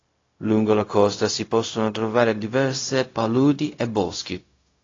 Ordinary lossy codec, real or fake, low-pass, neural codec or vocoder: AAC, 32 kbps; fake; 7.2 kHz; codec, 16 kHz, 0.4 kbps, LongCat-Audio-Codec